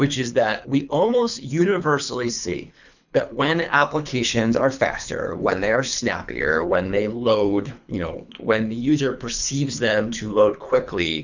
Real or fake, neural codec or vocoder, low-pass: fake; codec, 24 kHz, 3 kbps, HILCodec; 7.2 kHz